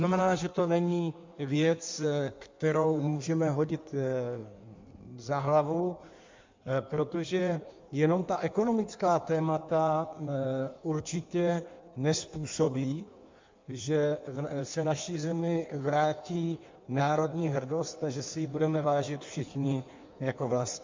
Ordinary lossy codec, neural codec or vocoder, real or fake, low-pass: MP3, 64 kbps; codec, 16 kHz in and 24 kHz out, 1.1 kbps, FireRedTTS-2 codec; fake; 7.2 kHz